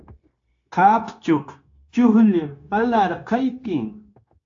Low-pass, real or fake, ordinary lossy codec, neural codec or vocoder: 7.2 kHz; fake; AAC, 48 kbps; codec, 16 kHz, 0.9 kbps, LongCat-Audio-Codec